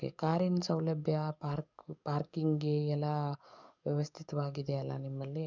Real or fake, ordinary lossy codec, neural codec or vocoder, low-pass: fake; none; codec, 44.1 kHz, 7.8 kbps, DAC; 7.2 kHz